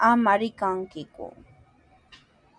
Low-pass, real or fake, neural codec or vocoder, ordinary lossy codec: 9.9 kHz; real; none; MP3, 96 kbps